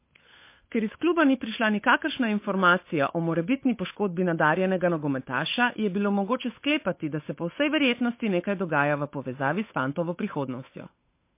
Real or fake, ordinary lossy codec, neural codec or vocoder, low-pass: fake; MP3, 24 kbps; codec, 16 kHz, 8 kbps, FunCodec, trained on Chinese and English, 25 frames a second; 3.6 kHz